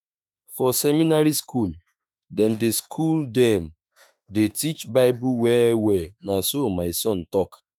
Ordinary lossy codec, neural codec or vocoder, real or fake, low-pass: none; autoencoder, 48 kHz, 32 numbers a frame, DAC-VAE, trained on Japanese speech; fake; none